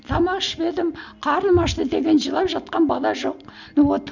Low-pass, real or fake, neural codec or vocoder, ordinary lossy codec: 7.2 kHz; real; none; none